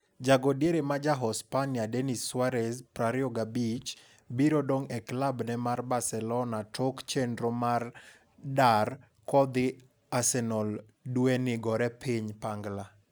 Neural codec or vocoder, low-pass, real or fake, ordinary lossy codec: none; none; real; none